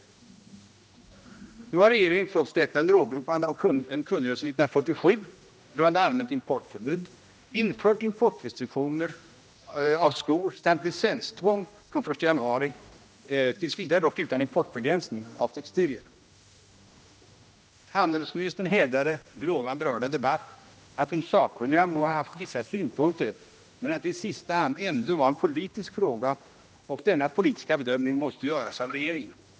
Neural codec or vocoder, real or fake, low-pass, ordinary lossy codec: codec, 16 kHz, 1 kbps, X-Codec, HuBERT features, trained on general audio; fake; none; none